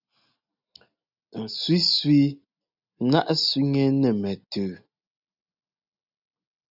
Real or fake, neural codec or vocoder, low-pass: real; none; 5.4 kHz